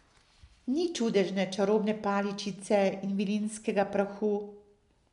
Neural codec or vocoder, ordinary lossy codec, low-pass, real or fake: none; MP3, 96 kbps; 10.8 kHz; real